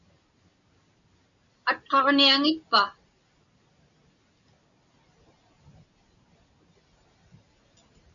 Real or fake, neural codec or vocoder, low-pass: real; none; 7.2 kHz